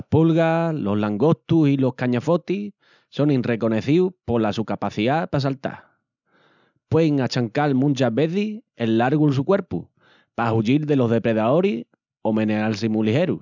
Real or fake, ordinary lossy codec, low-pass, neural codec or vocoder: real; none; 7.2 kHz; none